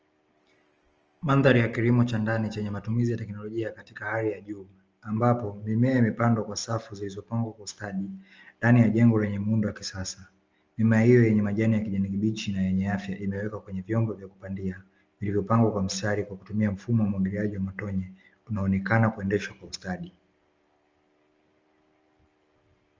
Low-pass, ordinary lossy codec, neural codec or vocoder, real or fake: 7.2 kHz; Opus, 24 kbps; none; real